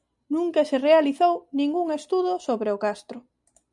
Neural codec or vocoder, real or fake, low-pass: none; real; 10.8 kHz